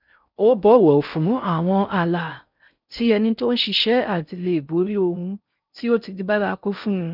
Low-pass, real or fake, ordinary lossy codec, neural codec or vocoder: 5.4 kHz; fake; none; codec, 16 kHz in and 24 kHz out, 0.6 kbps, FocalCodec, streaming, 4096 codes